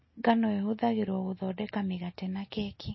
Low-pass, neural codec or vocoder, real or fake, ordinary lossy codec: 7.2 kHz; none; real; MP3, 24 kbps